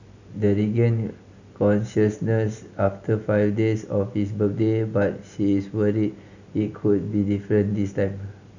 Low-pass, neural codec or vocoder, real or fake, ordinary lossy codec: 7.2 kHz; none; real; none